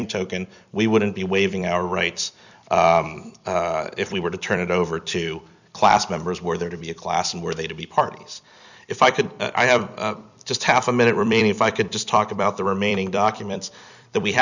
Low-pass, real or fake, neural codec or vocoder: 7.2 kHz; fake; vocoder, 44.1 kHz, 128 mel bands every 256 samples, BigVGAN v2